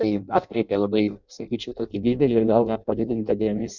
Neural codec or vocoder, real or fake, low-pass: codec, 16 kHz in and 24 kHz out, 0.6 kbps, FireRedTTS-2 codec; fake; 7.2 kHz